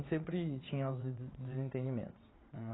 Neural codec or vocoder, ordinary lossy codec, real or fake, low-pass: vocoder, 22.05 kHz, 80 mel bands, WaveNeXt; AAC, 16 kbps; fake; 7.2 kHz